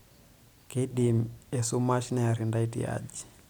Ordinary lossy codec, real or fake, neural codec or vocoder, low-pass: none; real; none; none